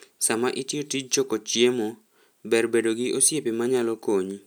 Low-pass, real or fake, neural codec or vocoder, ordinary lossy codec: none; real; none; none